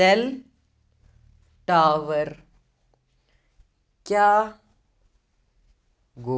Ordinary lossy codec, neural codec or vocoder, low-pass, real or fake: none; none; none; real